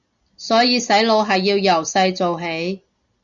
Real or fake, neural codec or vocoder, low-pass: real; none; 7.2 kHz